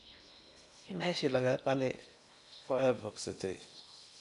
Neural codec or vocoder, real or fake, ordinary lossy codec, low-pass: codec, 16 kHz in and 24 kHz out, 0.8 kbps, FocalCodec, streaming, 65536 codes; fake; none; 10.8 kHz